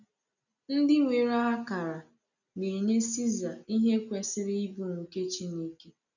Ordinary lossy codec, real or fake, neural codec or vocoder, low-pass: none; real; none; 7.2 kHz